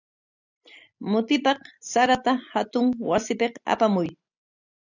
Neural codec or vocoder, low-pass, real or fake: none; 7.2 kHz; real